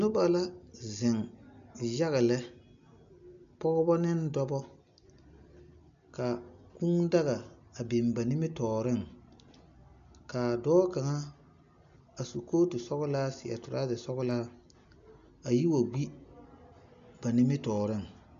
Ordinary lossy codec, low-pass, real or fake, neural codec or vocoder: AAC, 96 kbps; 7.2 kHz; real; none